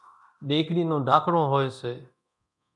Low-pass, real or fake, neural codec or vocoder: 10.8 kHz; fake; codec, 24 kHz, 0.9 kbps, DualCodec